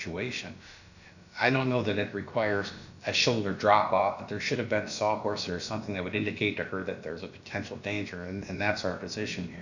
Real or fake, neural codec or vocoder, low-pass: fake; codec, 16 kHz, about 1 kbps, DyCAST, with the encoder's durations; 7.2 kHz